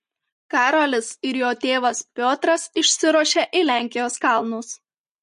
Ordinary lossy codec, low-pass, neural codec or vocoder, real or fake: MP3, 48 kbps; 10.8 kHz; none; real